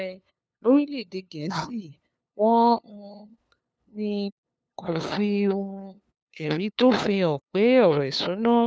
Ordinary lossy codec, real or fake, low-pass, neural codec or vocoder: none; fake; none; codec, 16 kHz, 2 kbps, FunCodec, trained on LibriTTS, 25 frames a second